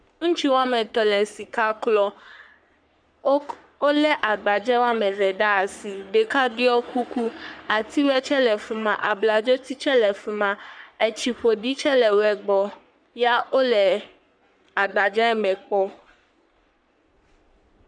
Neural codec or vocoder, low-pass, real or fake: codec, 44.1 kHz, 3.4 kbps, Pupu-Codec; 9.9 kHz; fake